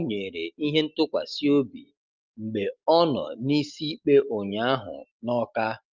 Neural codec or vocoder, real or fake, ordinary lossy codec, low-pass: none; real; Opus, 32 kbps; 7.2 kHz